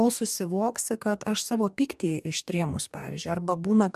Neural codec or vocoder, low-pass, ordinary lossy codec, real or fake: codec, 44.1 kHz, 2.6 kbps, DAC; 14.4 kHz; MP3, 96 kbps; fake